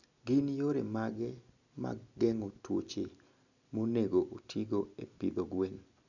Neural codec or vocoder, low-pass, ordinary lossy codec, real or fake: none; 7.2 kHz; none; real